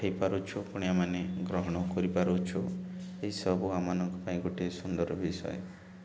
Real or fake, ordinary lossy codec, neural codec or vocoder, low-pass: real; none; none; none